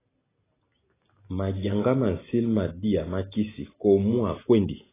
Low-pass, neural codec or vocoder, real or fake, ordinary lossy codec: 3.6 kHz; none; real; AAC, 16 kbps